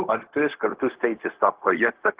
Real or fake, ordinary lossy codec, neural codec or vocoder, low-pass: fake; Opus, 16 kbps; codec, 16 kHz, 0.4 kbps, LongCat-Audio-Codec; 3.6 kHz